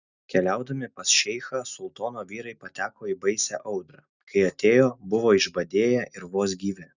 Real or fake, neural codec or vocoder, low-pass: real; none; 7.2 kHz